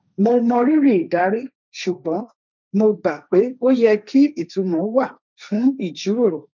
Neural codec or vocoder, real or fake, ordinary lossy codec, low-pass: codec, 16 kHz, 1.1 kbps, Voila-Tokenizer; fake; none; 7.2 kHz